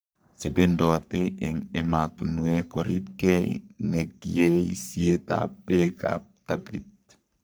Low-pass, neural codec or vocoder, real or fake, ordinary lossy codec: none; codec, 44.1 kHz, 3.4 kbps, Pupu-Codec; fake; none